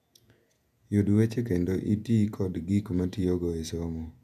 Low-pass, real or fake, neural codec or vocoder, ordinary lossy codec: 14.4 kHz; fake; vocoder, 48 kHz, 128 mel bands, Vocos; none